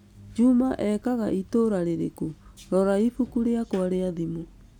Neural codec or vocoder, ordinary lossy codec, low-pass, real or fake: none; none; 19.8 kHz; real